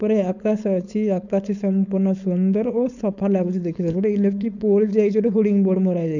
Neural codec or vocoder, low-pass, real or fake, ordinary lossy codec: codec, 16 kHz, 4.8 kbps, FACodec; 7.2 kHz; fake; none